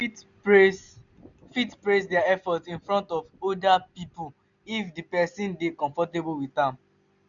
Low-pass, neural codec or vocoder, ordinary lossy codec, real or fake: 7.2 kHz; none; none; real